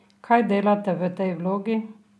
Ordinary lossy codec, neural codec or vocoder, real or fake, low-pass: none; none; real; none